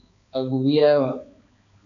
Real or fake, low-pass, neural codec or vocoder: fake; 7.2 kHz; codec, 16 kHz, 2 kbps, X-Codec, HuBERT features, trained on balanced general audio